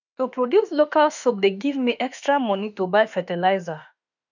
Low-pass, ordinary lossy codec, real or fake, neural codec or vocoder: 7.2 kHz; none; fake; autoencoder, 48 kHz, 32 numbers a frame, DAC-VAE, trained on Japanese speech